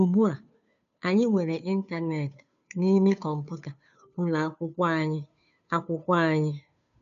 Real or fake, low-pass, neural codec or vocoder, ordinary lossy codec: fake; 7.2 kHz; codec, 16 kHz, 2 kbps, FunCodec, trained on Chinese and English, 25 frames a second; none